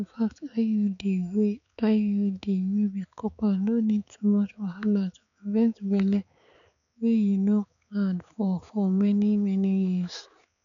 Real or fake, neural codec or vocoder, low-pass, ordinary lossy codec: fake; codec, 16 kHz, 4 kbps, X-Codec, HuBERT features, trained on balanced general audio; 7.2 kHz; none